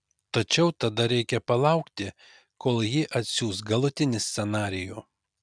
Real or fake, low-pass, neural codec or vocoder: real; 9.9 kHz; none